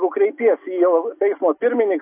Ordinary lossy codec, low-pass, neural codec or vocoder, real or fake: AAC, 24 kbps; 3.6 kHz; none; real